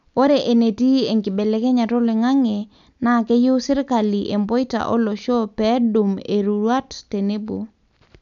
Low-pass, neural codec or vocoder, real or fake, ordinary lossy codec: 7.2 kHz; none; real; none